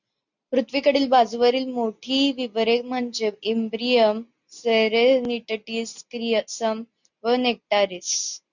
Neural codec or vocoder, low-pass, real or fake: none; 7.2 kHz; real